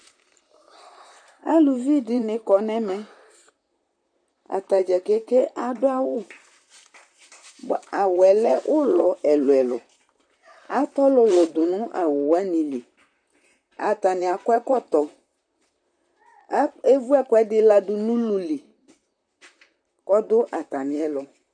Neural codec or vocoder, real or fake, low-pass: vocoder, 44.1 kHz, 128 mel bands, Pupu-Vocoder; fake; 9.9 kHz